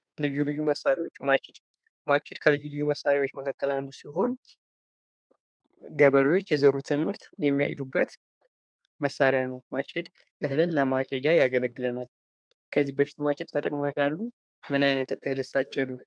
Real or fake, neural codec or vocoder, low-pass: fake; codec, 24 kHz, 1 kbps, SNAC; 9.9 kHz